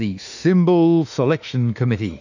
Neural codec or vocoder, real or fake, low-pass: autoencoder, 48 kHz, 32 numbers a frame, DAC-VAE, trained on Japanese speech; fake; 7.2 kHz